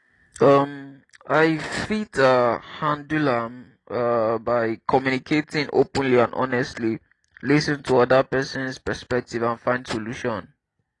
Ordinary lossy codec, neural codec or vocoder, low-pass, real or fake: AAC, 32 kbps; none; 10.8 kHz; real